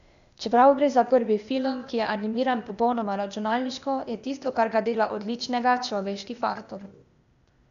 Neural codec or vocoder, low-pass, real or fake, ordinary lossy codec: codec, 16 kHz, 0.8 kbps, ZipCodec; 7.2 kHz; fake; none